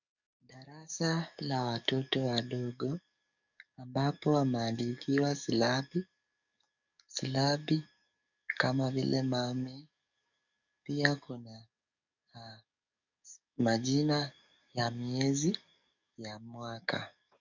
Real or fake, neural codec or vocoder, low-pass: fake; codec, 44.1 kHz, 7.8 kbps, DAC; 7.2 kHz